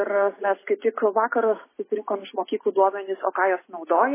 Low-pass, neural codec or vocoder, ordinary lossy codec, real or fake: 3.6 kHz; none; MP3, 16 kbps; real